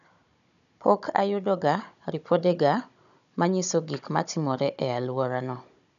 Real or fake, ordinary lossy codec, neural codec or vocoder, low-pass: fake; none; codec, 16 kHz, 16 kbps, FunCodec, trained on Chinese and English, 50 frames a second; 7.2 kHz